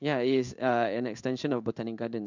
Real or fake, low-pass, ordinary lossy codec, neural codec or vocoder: fake; 7.2 kHz; none; codec, 16 kHz in and 24 kHz out, 1 kbps, XY-Tokenizer